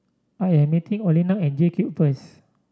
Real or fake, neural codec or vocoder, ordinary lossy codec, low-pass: real; none; none; none